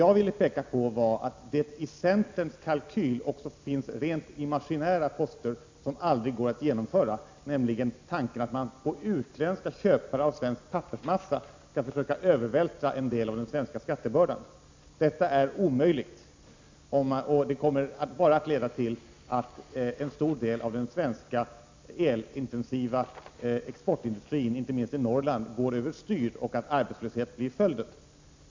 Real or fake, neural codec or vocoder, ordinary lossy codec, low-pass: real; none; MP3, 64 kbps; 7.2 kHz